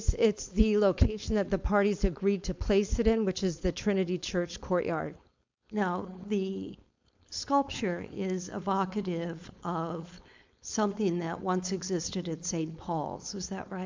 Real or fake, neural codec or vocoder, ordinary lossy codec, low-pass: fake; codec, 16 kHz, 4.8 kbps, FACodec; MP3, 64 kbps; 7.2 kHz